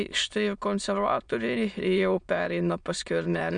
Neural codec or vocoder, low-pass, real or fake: autoencoder, 22.05 kHz, a latent of 192 numbers a frame, VITS, trained on many speakers; 9.9 kHz; fake